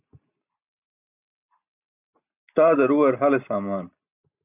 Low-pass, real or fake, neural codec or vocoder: 3.6 kHz; real; none